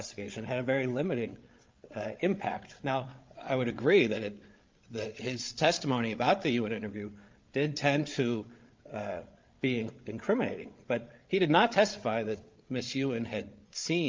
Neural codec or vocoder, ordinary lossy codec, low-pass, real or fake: codec, 16 kHz, 16 kbps, FreqCodec, larger model; Opus, 24 kbps; 7.2 kHz; fake